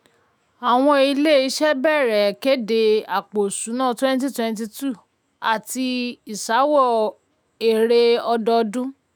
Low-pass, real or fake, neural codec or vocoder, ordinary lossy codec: none; fake; autoencoder, 48 kHz, 128 numbers a frame, DAC-VAE, trained on Japanese speech; none